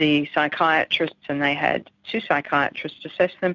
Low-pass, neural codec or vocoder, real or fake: 7.2 kHz; none; real